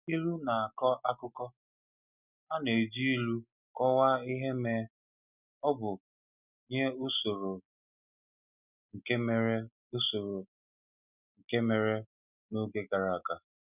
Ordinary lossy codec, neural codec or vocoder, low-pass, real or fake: none; none; 3.6 kHz; real